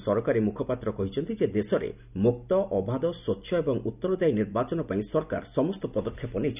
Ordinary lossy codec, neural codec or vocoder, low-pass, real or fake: none; none; 3.6 kHz; real